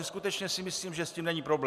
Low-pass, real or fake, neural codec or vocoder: 14.4 kHz; real; none